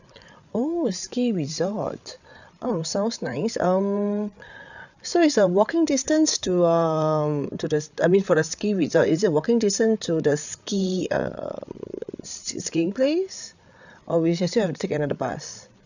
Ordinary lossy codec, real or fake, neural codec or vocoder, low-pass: none; fake; codec, 16 kHz, 8 kbps, FreqCodec, larger model; 7.2 kHz